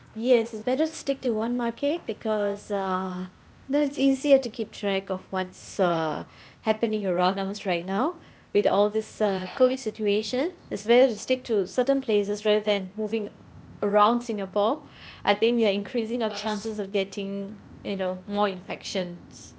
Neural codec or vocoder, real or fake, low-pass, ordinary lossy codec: codec, 16 kHz, 0.8 kbps, ZipCodec; fake; none; none